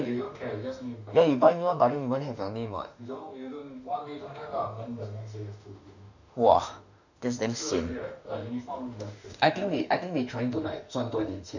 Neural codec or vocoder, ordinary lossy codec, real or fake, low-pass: autoencoder, 48 kHz, 32 numbers a frame, DAC-VAE, trained on Japanese speech; none; fake; 7.2 kHz